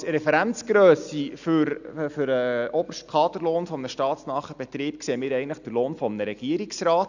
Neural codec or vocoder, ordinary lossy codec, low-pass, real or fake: none; none; 7.2 kHz; real